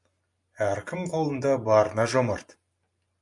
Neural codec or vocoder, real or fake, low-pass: none; real; 10.8 kHz